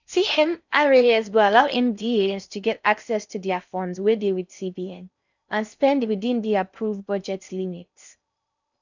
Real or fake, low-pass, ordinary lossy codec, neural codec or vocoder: fake; 7.2 kHz; none; codec, 16 kHz in and 24 kHz out, 0.6 kbps, FocalCodec, streaming, 4096 codes